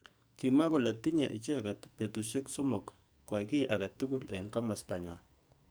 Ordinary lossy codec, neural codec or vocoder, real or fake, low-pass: none; codec, 44.1 kHz, 2.6 kbps, SNAC; fake; none